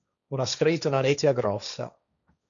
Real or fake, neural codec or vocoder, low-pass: fake; codec, 16 kHz, 1.1 kbps, Voila-Tokenizer; 7.2 kHz